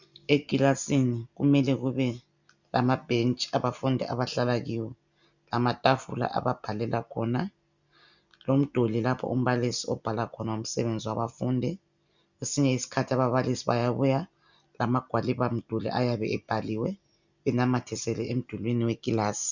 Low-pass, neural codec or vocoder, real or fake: 7.2 kHz; none; real